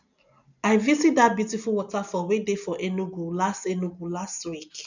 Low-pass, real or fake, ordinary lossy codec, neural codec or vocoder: 7.2 kHz; real; none; none